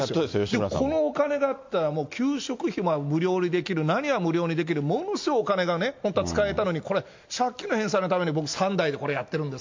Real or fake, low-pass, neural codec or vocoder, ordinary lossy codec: real; 7.2 kHz; none; MP3, 48 kbps